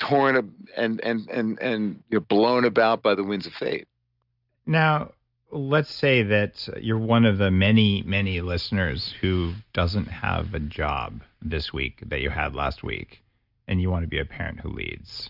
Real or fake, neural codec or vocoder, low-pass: real; none; 5.4 kHz